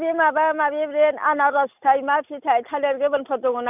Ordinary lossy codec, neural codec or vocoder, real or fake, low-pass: none; none; real; 3.6 kHz